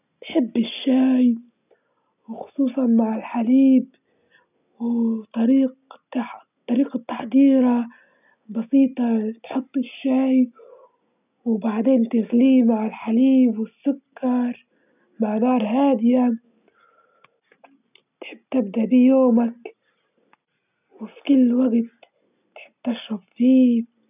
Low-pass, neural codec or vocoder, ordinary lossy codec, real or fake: 3.6 kHz; none; none; real